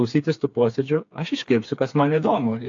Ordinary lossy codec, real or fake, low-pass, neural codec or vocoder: AAC, 48 kbps; fake; 7.2 kHz; codec, 16 kHz, 4 kbps, FreqCodec, smaller model